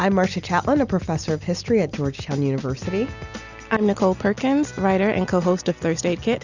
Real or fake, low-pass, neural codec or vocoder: real; 7.2 kHz; none